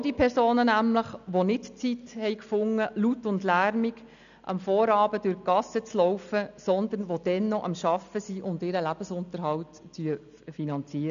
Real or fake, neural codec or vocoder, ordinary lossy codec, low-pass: real; none; none; 7.2 kHz